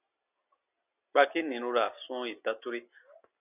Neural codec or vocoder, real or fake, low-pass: none; real; 3.6 kHz